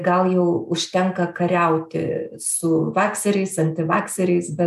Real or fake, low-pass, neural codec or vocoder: real; 14.4 kHz; none